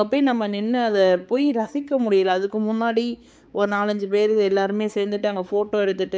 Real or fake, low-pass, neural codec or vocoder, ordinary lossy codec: fake; none; codec, 16 kHz, 4 kbps, X-Codec, HuBERT features, trained on balanced general audio; none